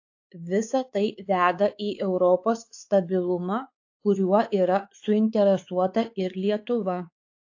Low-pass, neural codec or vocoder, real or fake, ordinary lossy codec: 7.2 kHz; codec, 16 kHz, 4 kbps, X-Codec, WavLM features, trained on Multilingual LibriSpeech; fake; AAC, 48 kbps